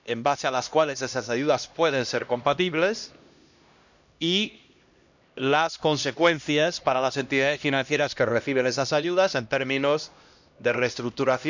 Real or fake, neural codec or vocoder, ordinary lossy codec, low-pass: fake; codec, 16 kHz, 1 kbps, X-Codec, HuBERT features, trained on LibriSpeech; none; 7.2 kHz